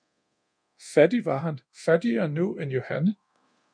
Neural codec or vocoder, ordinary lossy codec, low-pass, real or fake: codec, 24 kHz, 0.9 kbps, DualCodec; MP3, 64 kbps; 9.9 kHz; fake